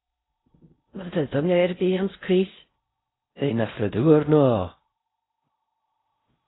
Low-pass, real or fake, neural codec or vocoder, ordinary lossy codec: 7.2 kHz; fake; codec, 16 kHz in and 24 kHz out, 0.6 kbps, FocalCodec, streaming, 4096 codes; AAC, 16 kbps